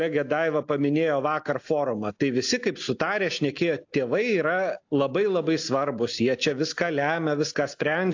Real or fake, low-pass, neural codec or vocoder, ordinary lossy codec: real; 7.2 kHz; none; AAC, 48 kbps